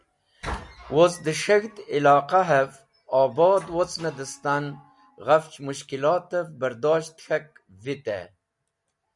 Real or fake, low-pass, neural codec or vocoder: real; 10.8 kHz; none